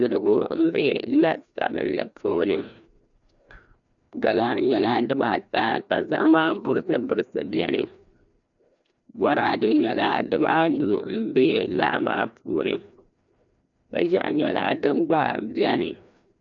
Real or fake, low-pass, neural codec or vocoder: fake; 7.2 kHz; codec, 16 kHz, 1 kbps, FreqCodec, larger model